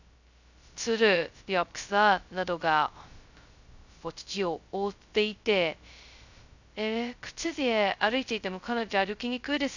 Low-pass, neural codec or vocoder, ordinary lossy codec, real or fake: 7.2 kHz; codec, 16 kHz, 0.2 kbps, FocalCodec; none; fake